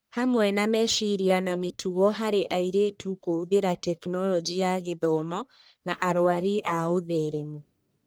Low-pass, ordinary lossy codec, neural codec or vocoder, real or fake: none; none; codec, 44.1 kHz, 1.7 kbps, Pupu-Codec; fake